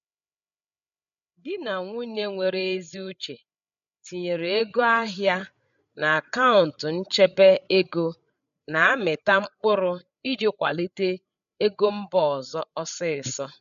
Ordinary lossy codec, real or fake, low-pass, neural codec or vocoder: none; fake; 7.2 kHz; codec, 16 kHz, 8 kbps, FreqCodec, larger model